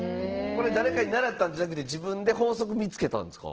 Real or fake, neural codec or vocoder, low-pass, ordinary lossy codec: real; none; 7.2 kHz; Opus, 16 kbps